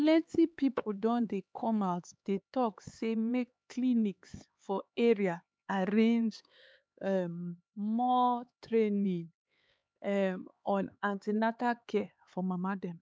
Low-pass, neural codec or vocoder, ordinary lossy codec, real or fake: none; codec, 16 kHz, 4 kbps, X-Codec, HuBERT features, trained on LibriSpeech; none; fake